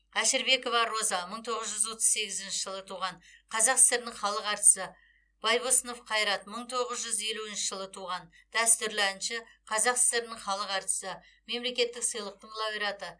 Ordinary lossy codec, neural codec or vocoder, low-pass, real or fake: none; none; 9.9 kHz; real